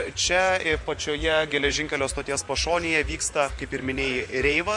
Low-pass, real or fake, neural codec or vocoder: 10.8 kHz; fake; vocoder, 24 kHz, 100 mel bands, Vocos